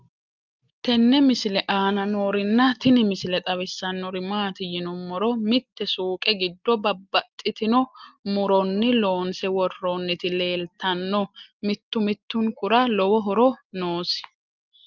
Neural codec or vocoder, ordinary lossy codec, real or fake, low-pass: none; Opus, 32 kbps; real; 7.2 kHz